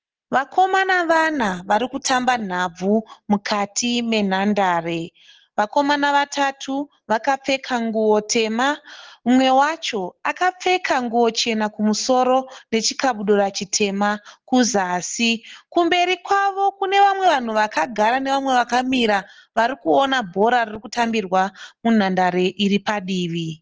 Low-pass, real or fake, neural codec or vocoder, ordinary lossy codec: 7.2 kHz; real; none; Opus, 16 kbps